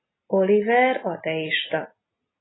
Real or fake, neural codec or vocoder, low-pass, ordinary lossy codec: real; none; 7.2 kHz; AAC, 16 kbps